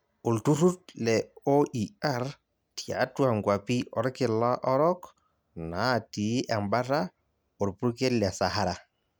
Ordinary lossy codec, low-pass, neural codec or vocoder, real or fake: none; none; none; real